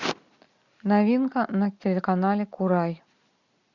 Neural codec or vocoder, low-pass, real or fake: none; 7.2 kHz; real